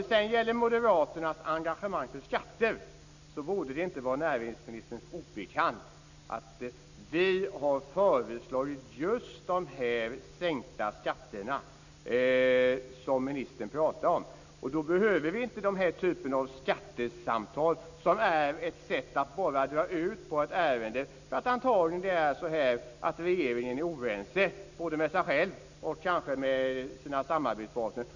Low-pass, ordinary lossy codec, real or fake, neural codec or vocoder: 7.2 kHz; none; real; none